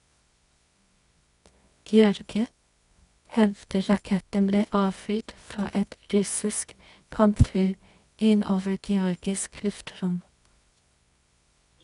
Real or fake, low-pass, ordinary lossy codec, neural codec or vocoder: fake; 10.8 kHz; none; codec, 24 kHz, 0.9 kbps, WavTokenizer, medium music audio release